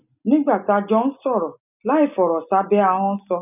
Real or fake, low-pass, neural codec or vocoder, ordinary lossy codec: real; 3.6 kHz; none; none